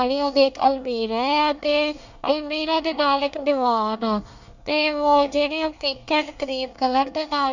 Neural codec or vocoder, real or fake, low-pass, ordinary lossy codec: codec, 24 kHz, 1 kbps, SNAC; fake; 7.2 kHz; none